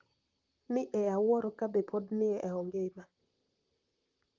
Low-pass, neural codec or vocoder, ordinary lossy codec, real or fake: 7.2 kHz; vocoder, 44.1 kHz, 128 mel bands, Pupu-Vocoder; Opus, 32 kbps; fake